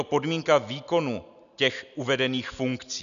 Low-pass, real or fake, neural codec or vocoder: 7.2 kHz; real; none